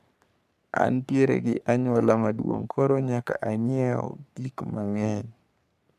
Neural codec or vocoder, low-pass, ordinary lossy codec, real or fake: codec, 44.1 kHz, 3.4 kbps, Pupu-Codec; 14.4 kHz; none; fake